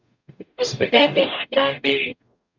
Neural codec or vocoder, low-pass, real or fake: codec, 44.1 kHz, 0.9 kbps, DAC; 7.2 kHz; fake